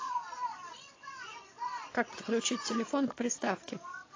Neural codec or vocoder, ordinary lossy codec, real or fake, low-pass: vocoder, 22.05 kHz, 80 mel bands, Vocos; AAC, 32 kbps; fake; 7.2 kHz